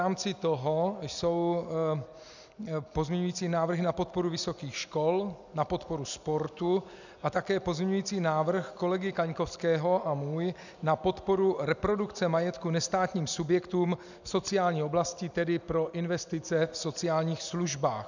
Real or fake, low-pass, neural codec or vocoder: real; 7.2 kHz; none